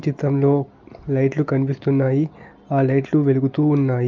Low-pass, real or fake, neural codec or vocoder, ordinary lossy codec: 7.2 kHz; real; none; Opus, 24 kbps